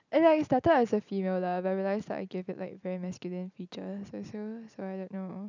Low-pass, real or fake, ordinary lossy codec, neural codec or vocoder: 7.2 kHz; real; none; none